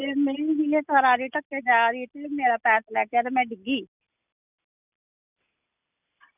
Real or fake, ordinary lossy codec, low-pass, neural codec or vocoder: real; none; 3.6 kHz; none